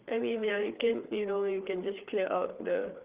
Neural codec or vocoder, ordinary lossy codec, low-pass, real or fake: codec, 16 kHz, 4 kbps, FreqCodec, larger model; Opus, 32 kbps; 3.6 kHz; fake